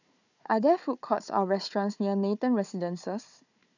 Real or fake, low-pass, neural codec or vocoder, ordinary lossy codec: fake; 7.2 kHz; codec, 16 kHz, 4 kbps, FunCodec, trained on Chinese and English, 50 frames a second; none